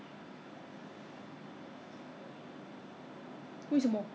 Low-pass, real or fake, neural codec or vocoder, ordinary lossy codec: none; real; none; none